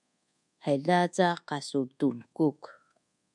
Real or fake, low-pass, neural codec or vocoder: fake; 10.8 kHz; codec, 24 kHz, 1.2 kbps, DualCodec